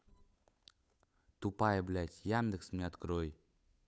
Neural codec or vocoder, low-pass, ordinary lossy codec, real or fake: codec, 16 kHz, 8 kbps, FunCodec, trained on Chinese and English, 25 frames a second; none; none; fake